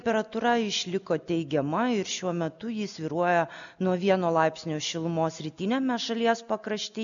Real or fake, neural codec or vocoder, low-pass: real; none; 7.2 kHz